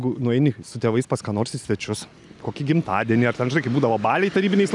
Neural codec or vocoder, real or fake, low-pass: none; real; 10.8 kHz